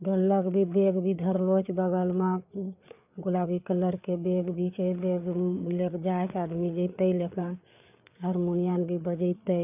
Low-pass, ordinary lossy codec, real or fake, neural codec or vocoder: 3.6 kHz; none; fake; codec, 16 kHz, 4 kbps, FreqCodec, larger model